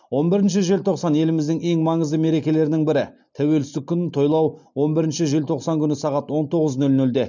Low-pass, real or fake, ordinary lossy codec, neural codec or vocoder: 7.2 kHz; real; none; none